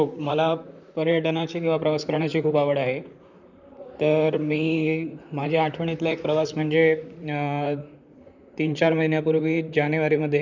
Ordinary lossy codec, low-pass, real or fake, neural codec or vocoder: none; 7.2 kHz; fake; vocoder, 44.1 kHz, 128 mel bands, Pupu-Vocoder